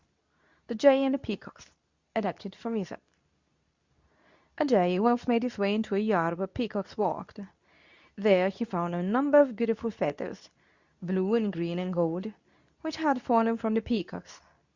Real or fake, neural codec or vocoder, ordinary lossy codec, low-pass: fake; codec, 24 kHz, 0.9 kbps, WavTokenizer, medium speech release version 2; Opus, 64 kbps; 7.2 kHz